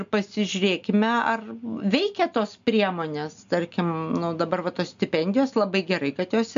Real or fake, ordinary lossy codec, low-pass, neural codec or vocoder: real; MP3, 64 kbps; 7.2 kHz; none